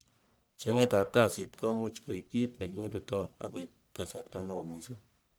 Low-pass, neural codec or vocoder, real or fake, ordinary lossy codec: none; codec, 44.1 kHz, 1.7 kbps, Pupu-Codec; fake; none